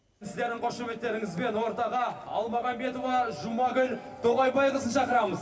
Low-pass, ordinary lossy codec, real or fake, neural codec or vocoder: none; none; real; none